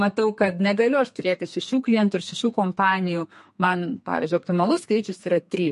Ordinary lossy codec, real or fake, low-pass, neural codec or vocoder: MP3, 48 kbps; fake; 14.4 kHz; codec, 32 kHz, 1.9 kbps, SNAC